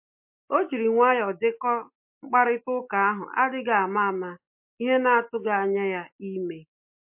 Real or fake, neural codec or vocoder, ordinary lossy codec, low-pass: real; none; MP3, 32 kbps; 3.6 kHz